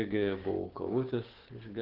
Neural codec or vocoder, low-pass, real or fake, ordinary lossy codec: codec, 16 kHz, 6 kbps, DAC; 5.4 kHz; fake; Opus, 16 kbps